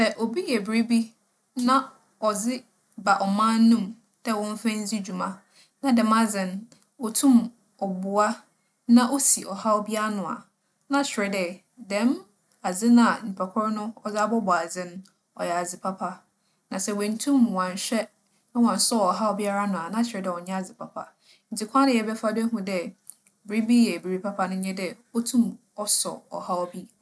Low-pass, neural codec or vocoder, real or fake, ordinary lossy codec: none; none; real; none